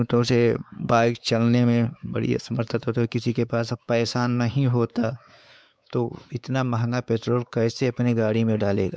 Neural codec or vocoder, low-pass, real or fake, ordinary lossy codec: codec, 16 kHz, 4 kbps, X-Codec, WavLM features, trained on Multilingual LibriSpeech; none; fake; none